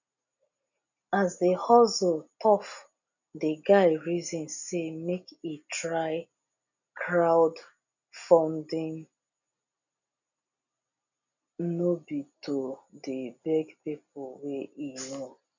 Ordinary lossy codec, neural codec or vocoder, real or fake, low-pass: none; none; real; 7.2 kHz